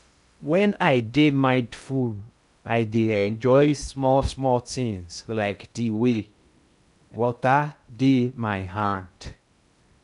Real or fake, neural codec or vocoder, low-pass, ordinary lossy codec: fake; codec, 16 kHz in and 24 kHz out, 0.6 kbps, FocalCodec, streaming, 2048 codes; 10.8 kHz; none